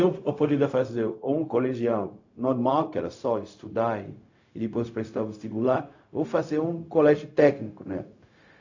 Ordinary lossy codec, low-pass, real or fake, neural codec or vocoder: AAC, 48 kbps; 7.2 kHz; fake; codec, 16 kHz, 0.4 kbps, LongCat-Audio-Codec